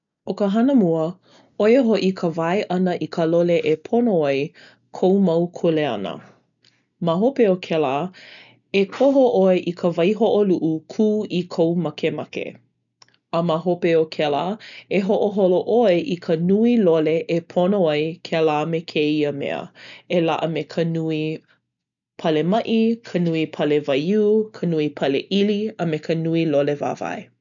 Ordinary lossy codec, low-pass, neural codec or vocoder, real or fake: none; 7.2 kHz; none; real